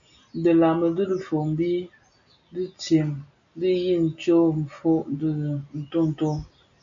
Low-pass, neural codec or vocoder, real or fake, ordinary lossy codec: 7.2 kHz; none; real; MP3, 64 kbps